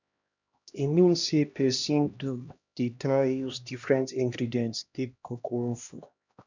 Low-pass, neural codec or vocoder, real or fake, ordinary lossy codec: 7.2 kHz; codec, 16 kHz, 1 kbps, X-Codec, HuBERT features, trained on LibriSpeech; fake; none